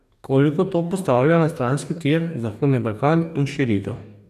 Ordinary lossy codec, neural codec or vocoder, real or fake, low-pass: none; codec, 44.1 kHz, 2.6 kbps, DAC; fake; 14.4 kHz